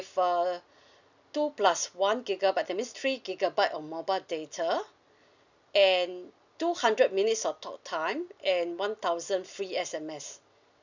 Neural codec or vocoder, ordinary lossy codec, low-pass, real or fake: none; none; 7.2 kHz; real